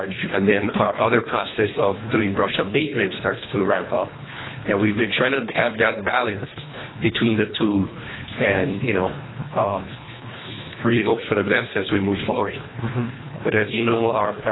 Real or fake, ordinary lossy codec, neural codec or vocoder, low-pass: fake; AAC, 16 kbps; codec, 24 kHz, 1.5 kbps, HILCodec; 7.2 kHz